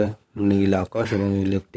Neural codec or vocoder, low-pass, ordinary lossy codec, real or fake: codec, 16 kHz, 16 kbps, FunCodec, trained on LibriTTS, 50 frames a second; none; none; fake